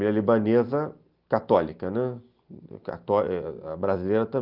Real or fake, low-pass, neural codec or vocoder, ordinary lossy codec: real; 5.4 kHz; none; Opus, 24 kbps